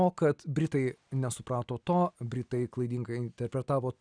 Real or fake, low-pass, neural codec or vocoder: real; 9.9 kHz; none